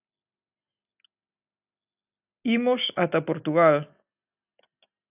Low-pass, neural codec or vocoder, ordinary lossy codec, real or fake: 3.6 kHz; none; AAC, 32 kbps; real